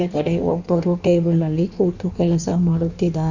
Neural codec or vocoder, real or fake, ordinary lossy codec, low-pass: codec, 16 kHz in and 24 kHz out, 1.1 kbps, FireRedTTS-2 codec; fake; none; 7.2 kHz